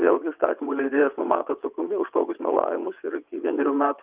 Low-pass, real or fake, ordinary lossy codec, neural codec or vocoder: 3.6 kHz; fake; Opus, 24 kbps; vocoder, 22.05 kHz, 80 mel bands, WaveNeXt